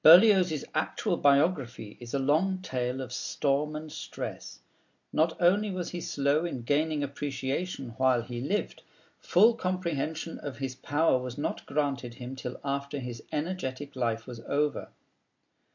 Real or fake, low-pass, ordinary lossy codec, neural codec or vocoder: real; 7.2 kHz; MP3, 48 kbps; none